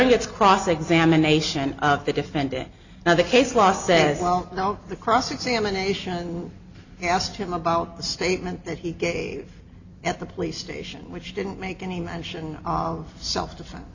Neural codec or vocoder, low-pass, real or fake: none; 7.2 kHz; real